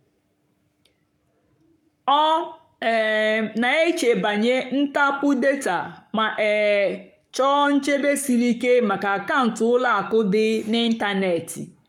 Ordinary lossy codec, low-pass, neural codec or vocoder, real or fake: none; 19.8 kHz; codec, 44.1 kHz, 7.8 kbps, Pupu-Codec; fake